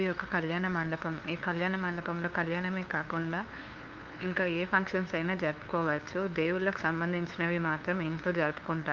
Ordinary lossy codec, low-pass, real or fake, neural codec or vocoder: Opus, 24 kbps; 7.2 kHz; fake; codec, 16 kHz, 2 kbps, FunCodec, trained on LibriTTS, 25 frames a second